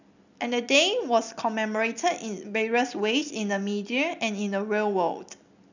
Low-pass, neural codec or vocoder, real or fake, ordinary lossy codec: 7.2 kHz; none; real; none